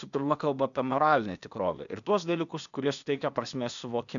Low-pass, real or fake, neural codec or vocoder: 7.2 kHz; fake; codec, 16 kHz, 0.8 kbps, ZipCodec